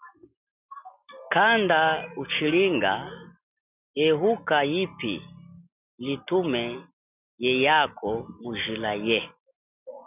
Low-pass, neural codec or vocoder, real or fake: 3.6 kHz; none; real